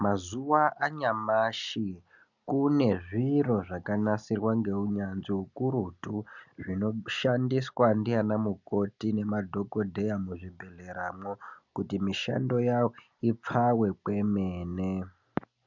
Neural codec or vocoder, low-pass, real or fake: none; 7.2 kHz; real